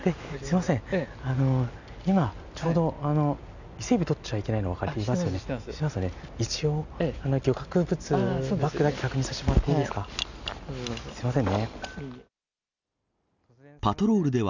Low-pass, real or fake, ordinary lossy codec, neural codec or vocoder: 7.2 kHz; real; none; none